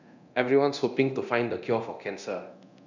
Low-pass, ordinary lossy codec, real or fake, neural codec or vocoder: 7.2 kHz; none; fake; codec, 24 kHz, 0.9 kbps, DualCodec